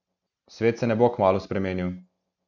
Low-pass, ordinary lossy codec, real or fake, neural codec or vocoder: 7.2 kHz; none; real; none